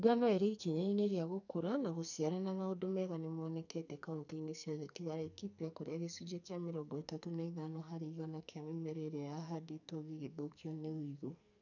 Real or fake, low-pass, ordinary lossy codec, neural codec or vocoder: fake; 7.2 kHz; none; codec, 44.1 kHz, 2.6 kbps, SNAC